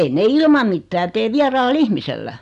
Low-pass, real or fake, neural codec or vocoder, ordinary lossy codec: 10.8 kHz; real; none; none